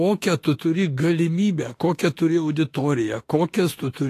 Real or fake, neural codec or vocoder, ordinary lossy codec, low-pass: fake; autoencoder, 48 kHz, 32 numbers a frame, DAC-VAE, trained on Japanese speech; AAC, 48 kbps; 14.4 kHz